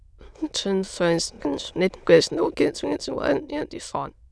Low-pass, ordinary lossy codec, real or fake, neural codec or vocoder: none; none; fake; autoencoder, 22.05 kHz, a latent of 192 numbers a frame, VITS, trained on many speakers